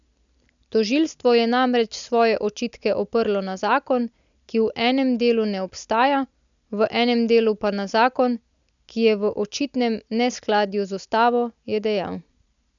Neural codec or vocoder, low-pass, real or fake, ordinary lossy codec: none; 7.2 kHz; real; none